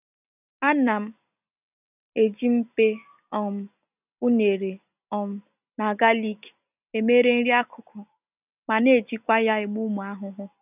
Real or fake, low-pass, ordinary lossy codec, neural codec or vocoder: real; 3.6 kHz; none; none